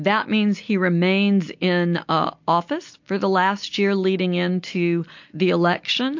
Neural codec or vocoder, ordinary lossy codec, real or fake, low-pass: none; MP3, 48 kbps; real; 7.2 kHz